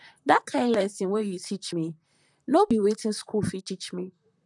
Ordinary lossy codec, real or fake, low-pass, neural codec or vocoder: none; fake; 10.8 kHz; vocoder, 44.1 kHz, 128 mel bands, Pupu-Vocoder